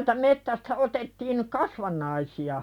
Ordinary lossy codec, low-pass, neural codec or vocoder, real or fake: none; 19.8 kHz; none; real